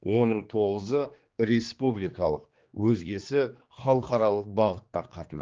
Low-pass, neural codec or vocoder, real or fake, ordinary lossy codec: 7.2 kHz; codec, 16 kHz, 2 kbps, X-Codec, HuBERT features, trained on general audio; fake; Opus, 24 kbps